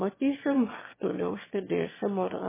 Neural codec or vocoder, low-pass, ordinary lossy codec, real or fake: autoencoder, 22.05 kHz, a latent of 192 numbers a frame, VITS, trained on one speaker; 3.6 kHz; MP3, 16 kbps; fake